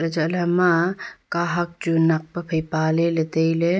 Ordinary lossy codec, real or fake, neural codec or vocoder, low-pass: none; real; none; none